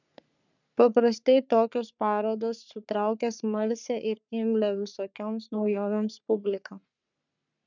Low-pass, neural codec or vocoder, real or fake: 7.2 kHz; codec, 44.1 kHz, 3.4 kbps, Pupu-Codec; fake